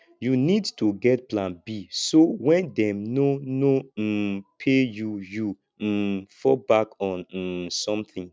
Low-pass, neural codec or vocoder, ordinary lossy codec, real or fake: none; none; none; real